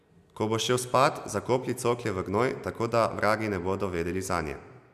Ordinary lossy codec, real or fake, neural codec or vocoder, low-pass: none; real; none; 14.4 kHz